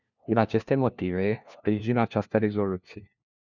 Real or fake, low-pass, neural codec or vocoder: fake; 7.2 kHz; codec, 16 kHz, 1 kbps, FunCodec, trained on LibriTTS, 50 frames a second